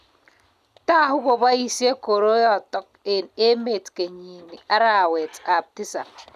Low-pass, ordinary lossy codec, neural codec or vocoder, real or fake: 14.4 kHz; none; none; real